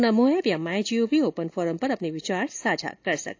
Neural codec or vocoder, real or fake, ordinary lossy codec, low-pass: none; real; AAC, 48 kbps; 7.2 kHz